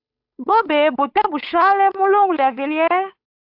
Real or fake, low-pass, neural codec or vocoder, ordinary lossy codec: fake; 5.4 kHz; codec, 16 kHz, 8 kbps, FunCodec, trained on Chinese and English, 25 frames a second; AAC, 48 kbps